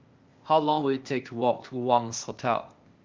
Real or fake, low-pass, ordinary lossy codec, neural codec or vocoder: fake; 7.2 kHz; Opus, 32 kbps; codec, 16 kHz, 0.8 kbps, ZipCodec